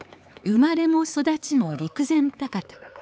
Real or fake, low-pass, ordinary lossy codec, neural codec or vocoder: fake; none; none; codec, 16 kHz, 4 kbps, X-Codec, HuBERT features, trained on LibriSpeech